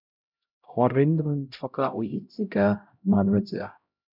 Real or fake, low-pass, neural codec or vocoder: fake; 5.4 kHz; codec, 16 kHz, 0.5 kbps, X-Codec, HuBERT features, trained on LibriSpeech